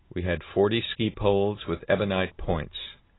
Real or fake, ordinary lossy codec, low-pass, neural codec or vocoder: real; AAC, 16 kbps; 7.2 kHz; none